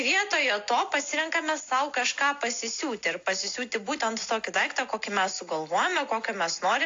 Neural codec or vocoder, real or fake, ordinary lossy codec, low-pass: none; real; AAC, 48 kbps; 7.2 kHz